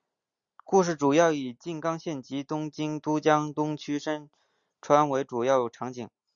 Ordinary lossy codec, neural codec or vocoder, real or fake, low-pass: Opus, 64 kbps; none; real; 7.2 kHz